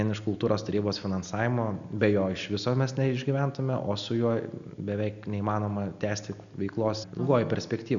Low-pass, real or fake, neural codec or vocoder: 7.2 kHz; real; none